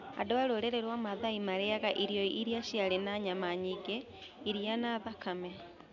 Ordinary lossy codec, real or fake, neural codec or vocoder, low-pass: none; real; none; 7.2 kHz